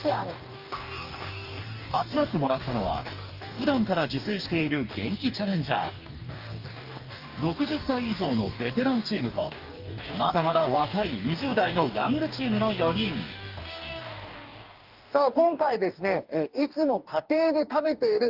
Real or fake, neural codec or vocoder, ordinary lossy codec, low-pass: fake; codec, 44.1 kHz, 2.6 kbps, DAC; Opus, 24 kbps; 5.4 kHz